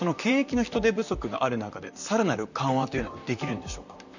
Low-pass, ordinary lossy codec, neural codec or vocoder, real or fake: 7.2 kHz; none; vocoder, 44.1 kHz, 128 mel bands, Pupu-Vocoder; fake